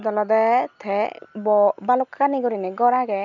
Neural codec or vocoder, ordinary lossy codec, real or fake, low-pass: none; none; real; 7.2 kHz